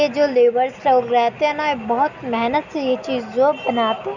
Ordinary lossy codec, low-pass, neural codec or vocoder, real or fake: none; 7.2 kHz; none; real